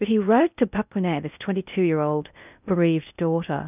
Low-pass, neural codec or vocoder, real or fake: 3.6 kHz; codec, 16 kHz in and 24 kHz out, 0.6 kbps, FocalCodec, streaming, 4096 codes; fake